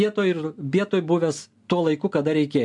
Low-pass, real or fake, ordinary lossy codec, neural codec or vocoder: 10.8 kHz; real; MP3, 64 kbps; none